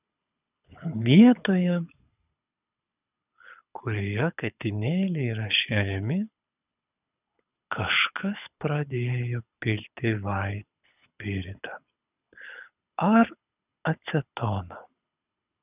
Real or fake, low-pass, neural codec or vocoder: fake; 3.6 kHz; codec, 24 kHz, 6 kbps, HILCodec